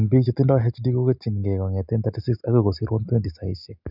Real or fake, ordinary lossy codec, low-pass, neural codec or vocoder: real; none; 5.4 kHz; none